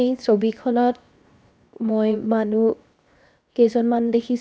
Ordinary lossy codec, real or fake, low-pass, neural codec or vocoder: none; fake; none; codec, 16 kHz, 0.7 kbps, FocalCodec